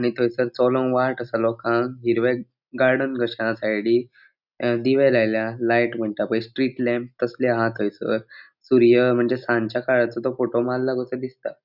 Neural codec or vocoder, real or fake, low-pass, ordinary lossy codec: none; real; 5.4 kHz; none